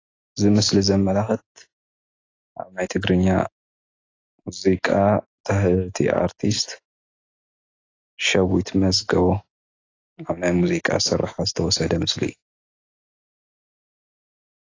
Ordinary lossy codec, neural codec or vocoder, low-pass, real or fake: AAC, 32 kbps; none; 7.2 kHz; real